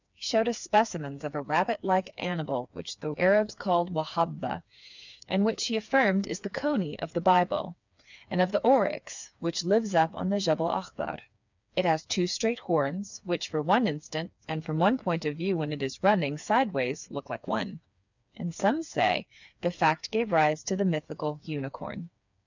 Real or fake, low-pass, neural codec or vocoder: fake; 7.2 kHz; codec, 16 kHz, 4 kbps, FreqCodec, smaller model